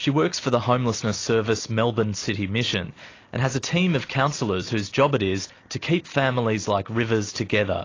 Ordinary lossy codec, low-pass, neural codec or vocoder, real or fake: AAC, 32 kbps; 7.2 kHz; none; real